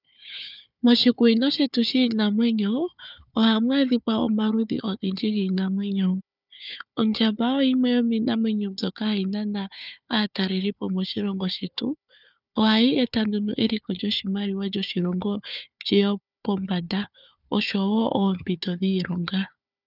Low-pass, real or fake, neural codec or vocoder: 5.4 kHz; fake; codec, 16 kHz, 4 kbps, FunCodec, trained on Chinese and English, 50 frames a second